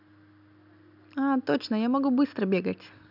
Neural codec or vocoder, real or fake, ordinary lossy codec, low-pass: none; real; none; 5.4 kHz